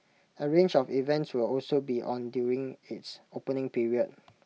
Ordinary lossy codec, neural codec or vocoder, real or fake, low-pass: none; none; real; none